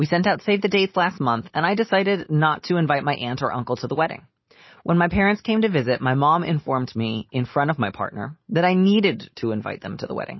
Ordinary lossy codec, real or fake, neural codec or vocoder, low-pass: MP3, 24 kbps; real; none; 7.2 kHz